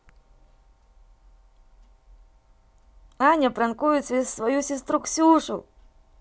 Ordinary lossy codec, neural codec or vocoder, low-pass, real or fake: none; none; none; real